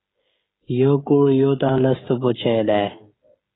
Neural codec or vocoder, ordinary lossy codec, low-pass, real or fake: codec, 16 kHz, 16 kbps, FreqCodec, smaller model; AAC, 16 kbps; 7.2 kHz; fake